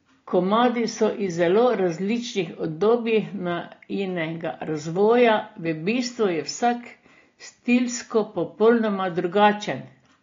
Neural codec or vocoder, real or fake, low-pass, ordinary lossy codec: none; real; 7.2 kHz; AAC, 32 kbps